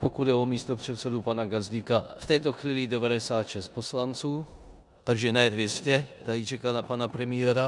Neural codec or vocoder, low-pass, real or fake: codec, 16 kHz in and 24 kHz out, 0.9 kbps, LongCat-Audio-Codec, four codebook decoder; 10.8 kHz; fake